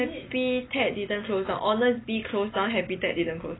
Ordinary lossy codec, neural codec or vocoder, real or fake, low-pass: AAC, 16 kbps; none; real; 7.2 kHz